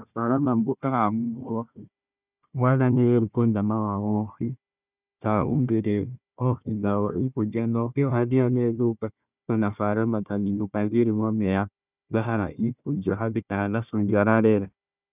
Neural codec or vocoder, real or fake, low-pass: codec, 16 kHz, 1 kbps, FunCodec, trained on Chinese and English, 50 frames a second; fake; 3.6 kHz